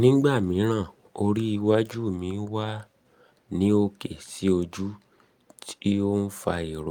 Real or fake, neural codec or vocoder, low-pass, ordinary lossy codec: real; none; 19.8 kHz; Opus, 32 kbps